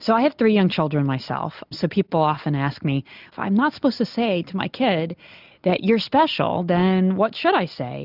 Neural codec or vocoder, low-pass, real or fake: none; 5.4 kHz; real